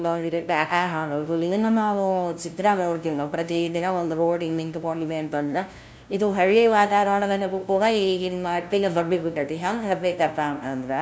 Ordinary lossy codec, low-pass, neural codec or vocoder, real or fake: none; none; codec, 16 kHz, 0.5 kbps, FunCodec, trained on LibriTTS, 25 frames a second; fake